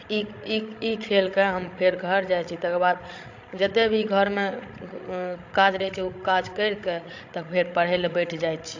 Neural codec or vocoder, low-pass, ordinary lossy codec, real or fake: codec, 16 kHz, 16 kbps, FreqCodec, larger model; 7.2 kHz; AAC, 48 kbps; fake